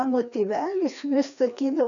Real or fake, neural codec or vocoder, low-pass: fake; codec, 16 kHz, 2 kbps, FreqCodec, larger model; 7.2 kHz